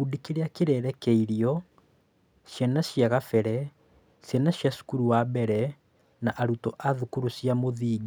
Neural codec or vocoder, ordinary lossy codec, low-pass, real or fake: none; none; none; real